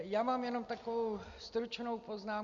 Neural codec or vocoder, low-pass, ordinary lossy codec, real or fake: none; 7.2 kHz; Opus, 64 kbps; real